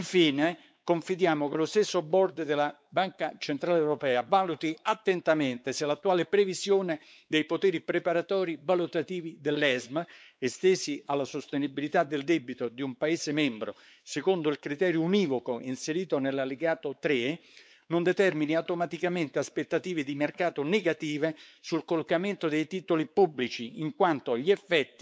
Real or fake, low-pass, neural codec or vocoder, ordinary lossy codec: fake; none; codec, 16 kHz, 4 kbps, X-Codec, WavLM features, trained on Multilingual LibriSpeech; none